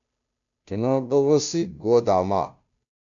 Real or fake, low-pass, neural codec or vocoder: fake; 7.2 kHz; codec, 16 kHz, 0.5 kbps, FunCodec, trained on Chinese and English, 25 frames a second